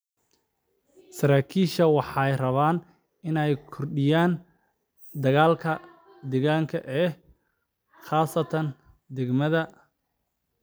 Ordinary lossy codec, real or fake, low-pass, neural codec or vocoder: none; real; none; none